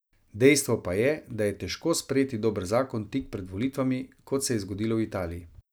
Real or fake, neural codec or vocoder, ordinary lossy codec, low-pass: real; none; none; none